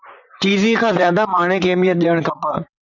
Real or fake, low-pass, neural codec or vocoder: fake; 7.2 kHz; vocoder, 44.1 kHz, 128 mel bands, Pupu-Vocoder